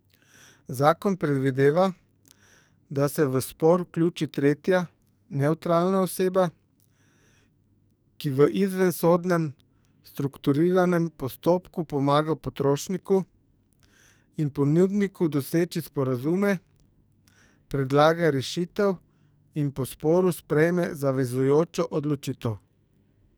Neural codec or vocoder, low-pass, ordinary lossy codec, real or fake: codec, 44.1 kHz, 2.6 kbps, SNAC; none; none; fake